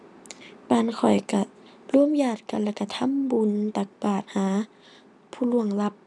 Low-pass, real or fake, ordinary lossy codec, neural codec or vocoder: none; real; none; none